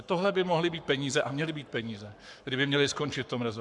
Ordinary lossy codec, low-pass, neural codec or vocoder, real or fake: MP3, 96 kbps; 10.8 kHz; codec, 44.1 kHz, 7.8 kbps, Pupu-Codec; fake